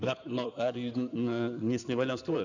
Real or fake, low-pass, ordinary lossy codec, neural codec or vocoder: fake; 7.2 kHz; none; codec, 16 kHz in and 24 kHz out, 2.2 kbps, FireRedTTS-2 codec